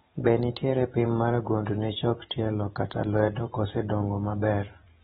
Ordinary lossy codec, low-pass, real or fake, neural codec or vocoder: AAC, 16 kbps; 19.8 kHz; fake; vocoder, 44.1 kHz, 128 mel bands every 256 samples, BigVGAN v2